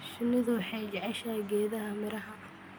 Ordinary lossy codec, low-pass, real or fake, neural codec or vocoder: none; none; real; none